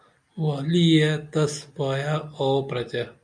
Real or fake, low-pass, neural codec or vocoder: real; 9.9 kHz; none